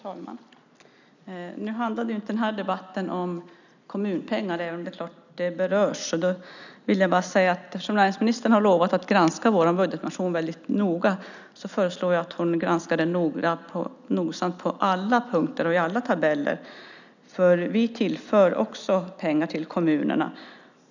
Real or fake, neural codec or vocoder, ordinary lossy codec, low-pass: real; none; none; 7.2 kHz